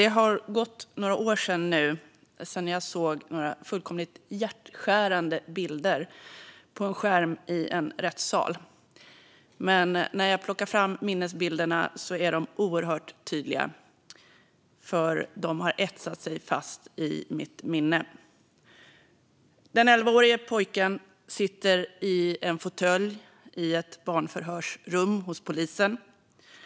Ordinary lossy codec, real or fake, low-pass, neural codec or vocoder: none; real; none; none